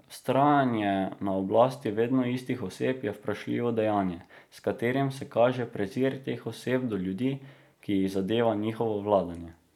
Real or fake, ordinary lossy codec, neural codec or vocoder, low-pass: real; none; none; 19.8 kHz